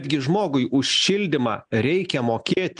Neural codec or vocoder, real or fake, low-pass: none; real; 9.9 kHz